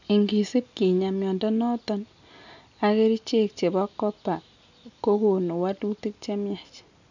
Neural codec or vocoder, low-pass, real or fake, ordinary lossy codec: none; 7.2 kHz; real; none